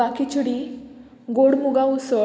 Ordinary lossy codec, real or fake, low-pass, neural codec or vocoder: none; real; none; none